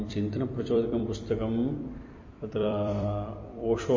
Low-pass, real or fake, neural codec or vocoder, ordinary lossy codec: 7.2 kHz; fake; vocoder, 44.1 kHz, 128 mel bands every 256 samples, BigVGAN v2; MP3, 32 kbps